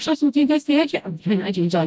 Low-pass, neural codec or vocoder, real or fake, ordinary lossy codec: none; codec, 16 kHz, 0.5 kbps, FreqCodec, smaller model; fake; none